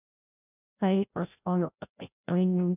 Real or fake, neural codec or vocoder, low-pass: fake; codec, 16 kHz, 0.5 kbps, FreqCodec, larger model; 3.6 kHz